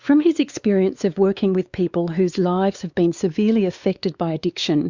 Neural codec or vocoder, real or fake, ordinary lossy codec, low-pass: codec, 16 kHz, 4 kbps, X-Codec, WavLM features, trained on Multilingual LibriSpeech; fake; Opus, 64 kbps; 7.2 kHz